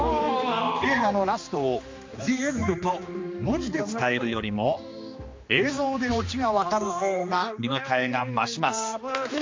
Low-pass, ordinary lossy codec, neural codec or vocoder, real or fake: 7.2 kHz; MP3, 48 kbps; codec, 16 kHz, 2 kbps, X-Codec, HuBERT features, trained on general audio; fake